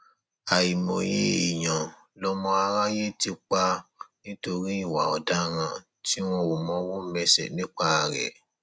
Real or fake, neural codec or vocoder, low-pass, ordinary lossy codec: real; none; none; none